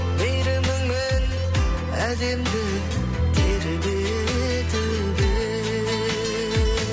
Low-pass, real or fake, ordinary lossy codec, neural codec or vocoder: none; real; none; none